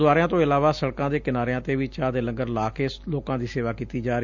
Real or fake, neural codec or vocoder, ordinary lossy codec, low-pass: real; none; Opus, 64 kbps; 7.2 kHz